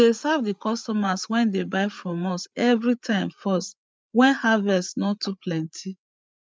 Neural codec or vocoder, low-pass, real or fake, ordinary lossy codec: codec, 16 kHz, 8 kbps, FreqCodec, larger model; none; fake; none